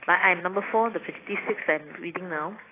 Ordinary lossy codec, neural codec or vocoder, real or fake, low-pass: AAC, 16 kbps; none; real; 3.6 kHz